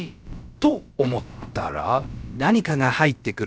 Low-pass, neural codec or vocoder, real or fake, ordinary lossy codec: none; codec, 16 kHz, about 1 kbps, DyCAST, with the encoder's durations; fake; none